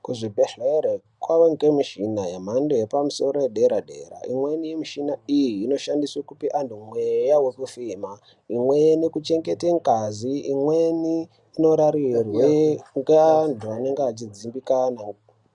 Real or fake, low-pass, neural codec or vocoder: real; 10.8 kHz; none